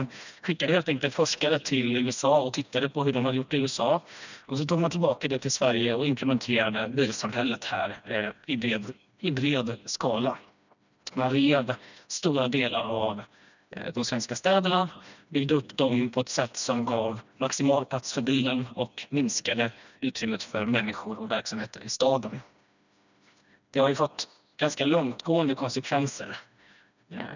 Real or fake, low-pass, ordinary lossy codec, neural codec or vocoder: fake; 7.2 kHz; none; codec, 16 kHz, 1 kbps, FreqCodec, smaller model